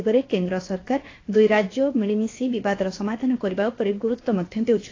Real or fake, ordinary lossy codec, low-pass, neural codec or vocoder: fake; AAC, 32 kbps; 7.2 kHz; codec, 16 kHz, 0.7 kbps, FocalCodec